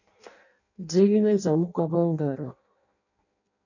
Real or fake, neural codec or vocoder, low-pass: fake; codec, 16 kHz in and 24 kHz out, 0.6 kbps, FireRedTTS-2 codec; 7.2 kHz